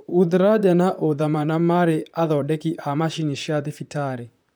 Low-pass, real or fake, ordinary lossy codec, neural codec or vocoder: none; fake; none; vocoder, 44.1 kHz, 128 mel bands, Pupu-Vocoder